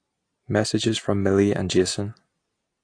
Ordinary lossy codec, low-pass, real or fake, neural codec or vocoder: AAC, 48 kbps; 9.9 kHz; real; none